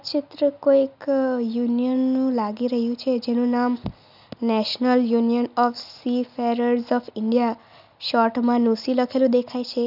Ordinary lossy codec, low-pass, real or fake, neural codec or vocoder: none; 5.4 kHz; real; none